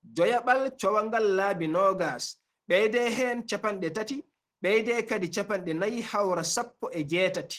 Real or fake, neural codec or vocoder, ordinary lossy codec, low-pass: real; none; Opus, 16 kbps; 14.4 kHz